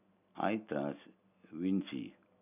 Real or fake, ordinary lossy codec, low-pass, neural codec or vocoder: real; none; 3.6 kHz; none